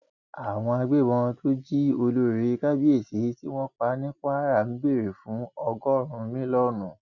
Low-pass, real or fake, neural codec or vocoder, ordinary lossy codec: 7.2 kHz; real; none; none